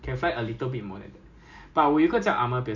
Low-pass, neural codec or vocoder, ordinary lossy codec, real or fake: 7.2 kHz; none; none; real